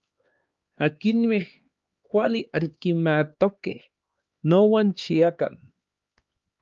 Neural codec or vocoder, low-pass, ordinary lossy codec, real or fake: codec, 16 kHz, 2 kbps, X-Codec, HuBERT features, trained on LibriSpeech; 7.2 kHz; Opus, 32 kbps; fake